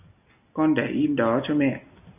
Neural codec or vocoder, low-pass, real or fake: none; 3.6 kHz; real